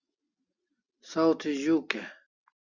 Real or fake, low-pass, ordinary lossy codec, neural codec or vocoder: real; 7.2 kHz; Opus, 64 kbps; none